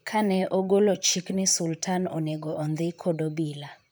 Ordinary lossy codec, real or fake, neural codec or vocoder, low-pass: none; fake; vocoder, 44.1 kHz, 128 mel bands, Pupu-Vocoder; none